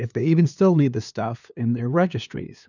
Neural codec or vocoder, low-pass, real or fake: codec, 16 kHz, 2 kbps, FunCodec, trained on LibriTTS, 25 frames a second; 7.2 kHz; fake